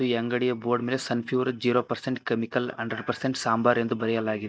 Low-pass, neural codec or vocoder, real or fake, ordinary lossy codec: none; none; real; none